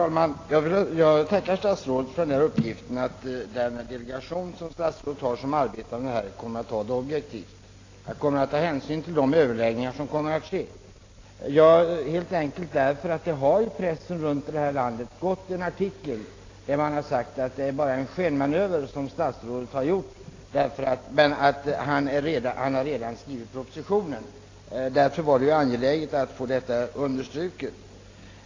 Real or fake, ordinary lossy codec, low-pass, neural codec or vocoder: real; AAC, 32 kbps; 7.2 kHz; none